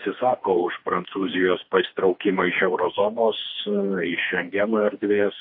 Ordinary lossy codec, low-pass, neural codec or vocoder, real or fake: MP3, 32 kbps; 5.4 kHz; codec, 16 kHz, 2 kbps, FreqCodec, smaller model; fake